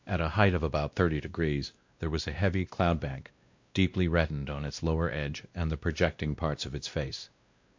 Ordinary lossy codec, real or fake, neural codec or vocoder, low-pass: MP3, 48 kbps; fake; codec, 16 kHz, 1 kbps, X-Codec, WavLM features, trained on Multilingual LibriSpeech; 7.2 kHz